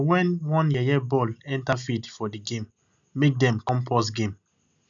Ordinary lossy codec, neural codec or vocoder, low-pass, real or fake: none; none; 7.2 kHz; real